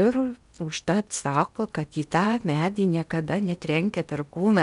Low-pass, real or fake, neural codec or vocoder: 10.8 kHz; fake; codec, 16 kHz in and 24 kHz out, 0.8 kbps, FocalCodec, streaming, 65536 codes